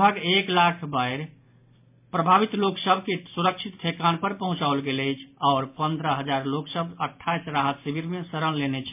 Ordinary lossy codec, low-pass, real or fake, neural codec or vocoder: MP3, 32 kbps; 3.6 kHz; real; none